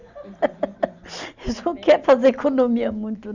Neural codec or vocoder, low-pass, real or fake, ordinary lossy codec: none; 7.2 kHz; real; none